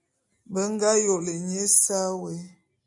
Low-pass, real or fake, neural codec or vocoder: 10.8 kHz; real; none